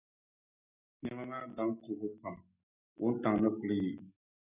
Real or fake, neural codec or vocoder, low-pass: real; none; 3.6 kHz